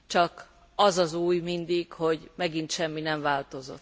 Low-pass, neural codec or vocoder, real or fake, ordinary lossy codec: none; none; real; none